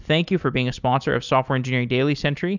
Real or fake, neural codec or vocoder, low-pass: real; none; 7.2 kHz